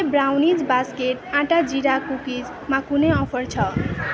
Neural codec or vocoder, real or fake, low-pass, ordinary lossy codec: none; real; none; none